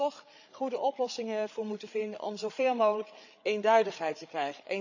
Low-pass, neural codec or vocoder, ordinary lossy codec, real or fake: 7.2 kHz; codec, 16 kHz, 8 kbps, FreqCodec, larger model; none; fake